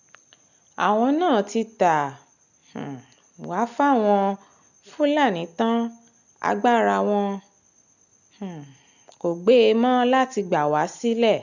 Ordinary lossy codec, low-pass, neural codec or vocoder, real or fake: none; 7.2 kHz; none; real